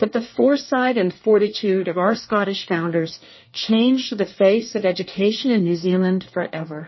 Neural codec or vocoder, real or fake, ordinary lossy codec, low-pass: codec, 24 kHz, 1 kbps, SNAC; fake; MP3, 24 kbps; 7.2 kHz